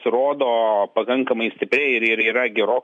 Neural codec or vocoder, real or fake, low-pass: none; real; 10.8 kHz